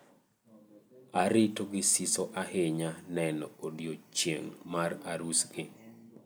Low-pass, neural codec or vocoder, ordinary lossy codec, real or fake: none; none; none; real